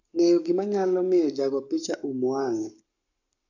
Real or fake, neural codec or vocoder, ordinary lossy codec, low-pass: fake; codec, 44.1 kHz, 7.8 kbps, Pupu-Codec; none; 7.2 kHz